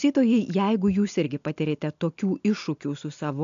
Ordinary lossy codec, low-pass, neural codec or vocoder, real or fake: AAC, 64 kbps; 7.2 kHz; none; real